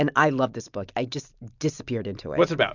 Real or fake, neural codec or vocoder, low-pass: real; none; 7.2 kHz